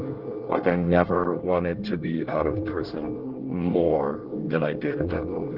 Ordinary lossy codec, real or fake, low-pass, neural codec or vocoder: Opus, 16 kbps; fake; 5.4 kHz; codec, 24 kHz, 1 kbps, SNAC